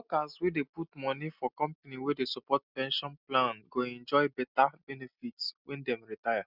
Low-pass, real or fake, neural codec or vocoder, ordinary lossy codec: 5.4 kHz; real; none; none